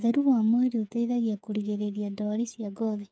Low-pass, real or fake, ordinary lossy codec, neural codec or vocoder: none; fake; none; codec, 16 kHz, 8 kbps, FreqCodec, smaller model